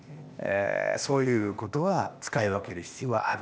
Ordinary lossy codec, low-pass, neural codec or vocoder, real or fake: none; none; codec, 16 kHz, 0.8 kbps, ZipCodec; fake